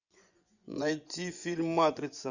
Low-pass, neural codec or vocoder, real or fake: 7.2 kHz; vocoder, 24 kHz, 100 mel bands, Vocos; fake